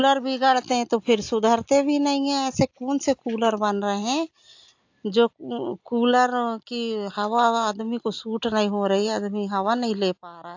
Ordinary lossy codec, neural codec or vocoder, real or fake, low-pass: AAC, 48 kbps; none; real; 7.2 kHz